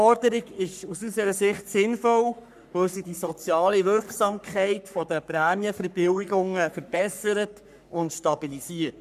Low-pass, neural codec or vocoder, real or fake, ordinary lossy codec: 14.4 kHz; codec, 44.1 kHz, 3.4 kbps, Pupu-Codec; fake; none